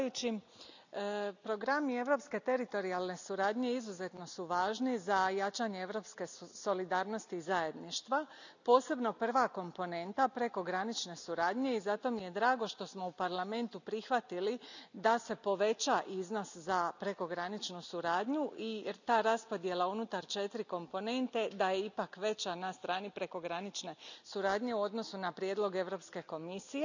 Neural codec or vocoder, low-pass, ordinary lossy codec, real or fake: none; 7.2 kHz; none; real